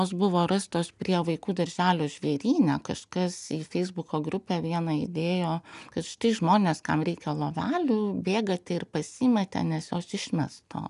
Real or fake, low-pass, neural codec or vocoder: real; 10.8 kHz; none